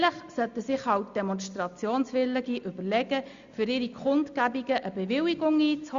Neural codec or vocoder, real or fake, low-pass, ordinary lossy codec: none; real; 7.2 kHz; Opus, 64 kbps